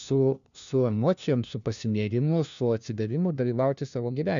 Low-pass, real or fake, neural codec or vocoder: 7.2 kHz; fake; codec, 16 kHz, 1 kbps, FunCodec, trained on LibriTTS, 50 frames a second